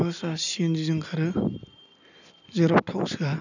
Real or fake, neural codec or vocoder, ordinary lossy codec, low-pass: real; none; none; 7.2 kHz